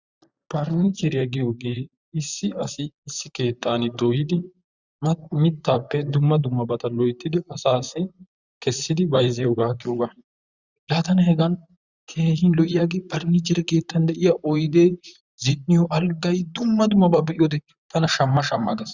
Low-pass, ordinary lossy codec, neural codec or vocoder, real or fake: 7.2 kHz; Opus, 64 kbps; vocoder, 44.1 kHz, 128 mel bands, Pupu-Vocoder; fake